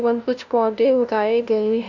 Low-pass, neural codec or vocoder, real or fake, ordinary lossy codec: 7.2 kHz; codec, 16 kHz, 0.5 kbps, FunCodec, trained on LibriTTS, 25 frames a second; fake; none